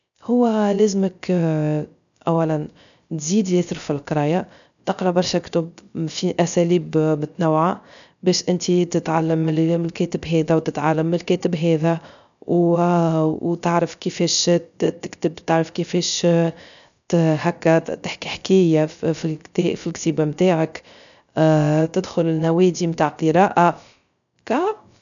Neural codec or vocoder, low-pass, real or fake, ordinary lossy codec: codec, 16 kHz, 0.3 kbps, FocalCodec; 7.2 kHz; fake; none